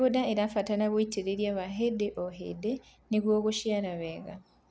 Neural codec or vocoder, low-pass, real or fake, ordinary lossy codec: none; none; real; none